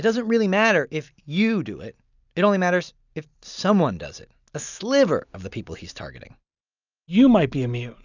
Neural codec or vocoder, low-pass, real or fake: none; 7.2 kHz; real